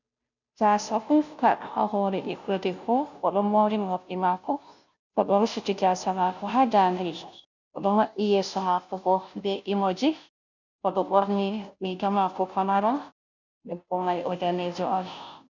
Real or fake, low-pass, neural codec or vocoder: fake; 7.2 kHz; codec, 16 kHz, 0.5 kbps, FunCodec, trained on Chinese and English, 25 frames a second